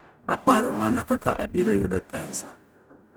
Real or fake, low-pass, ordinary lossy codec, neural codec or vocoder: fake; none; none; codec, 44.1 kHz, 0.9 kbps, DAC